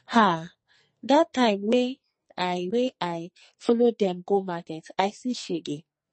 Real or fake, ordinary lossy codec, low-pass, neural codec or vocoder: fake; MP3, 32 kbps; 10.8 kHz; codec, 44.1 kHz, 2.6 kbps, SNAC